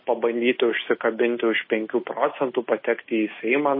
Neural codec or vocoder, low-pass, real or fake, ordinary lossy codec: none; 5.4 kHz; real; MP3, 24 kbps